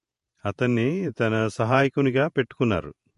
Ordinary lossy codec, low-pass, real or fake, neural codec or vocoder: MP3, 48 kbps; 14.4 kHz; fake; vocoder, 48 kHz, 128 mel bands, Vocos